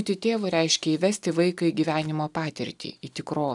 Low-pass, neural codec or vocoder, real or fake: 10.8 kHz; none; real